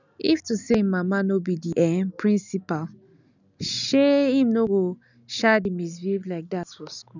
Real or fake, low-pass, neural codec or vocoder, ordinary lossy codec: real; 7.2 kHz; none; none